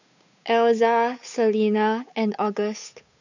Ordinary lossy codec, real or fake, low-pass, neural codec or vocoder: none; fake; 7.2 kHz; codec, 16 kHz, 8 kbps, FunCodec, trained on Chinese and English, 25 frames a second